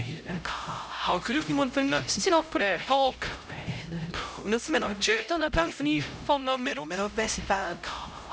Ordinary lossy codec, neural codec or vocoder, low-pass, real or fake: none; codec, 16 kHz, 0.5 kbps, X-Codec, HuBERT features, trained on LibriSpeech; none; fake